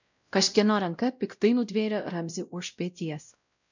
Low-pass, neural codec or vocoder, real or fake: 7.2 kHz; codec, 16 kHz, 0.5 kbps, X-Codec, WavLM features, trained on Multilingual LibriSpeech; fake